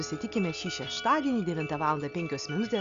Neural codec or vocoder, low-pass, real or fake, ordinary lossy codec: none; 7.2 kHz; real; Opus, 64 kbps